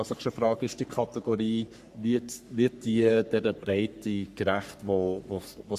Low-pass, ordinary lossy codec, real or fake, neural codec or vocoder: 14.4 kHz; Opus, 64 kbps; fake; codec, 44.1 kHz, 3.4 kbps, Pupu-Codec